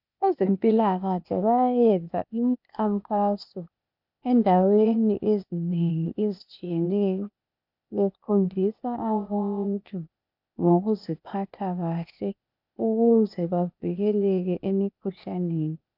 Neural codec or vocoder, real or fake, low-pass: codec, 16 kHz, 0.8 kbps, ZipCodec; fake; 5.4 kHz